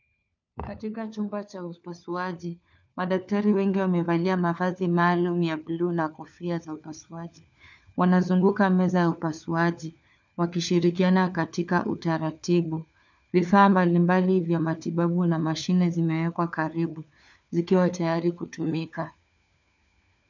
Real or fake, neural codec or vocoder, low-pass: fake; codec, 16 kHz, 4 kbps, FunCodec, trained on LibriTTS, 50 frames a second; 7.2 kHz